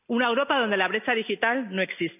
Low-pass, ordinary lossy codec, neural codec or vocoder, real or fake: 3.6 kHz; none; none; real